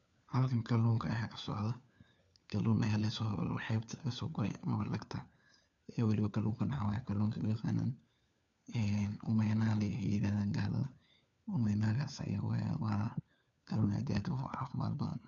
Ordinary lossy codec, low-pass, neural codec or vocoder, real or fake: none; 7.2 kHz; codec, 16 kHz, 4 kbps, FunCodec, trained on LibriTTS, 50 frames a second; fake